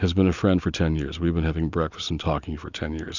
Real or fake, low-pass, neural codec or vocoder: real; 7.2 kHz; none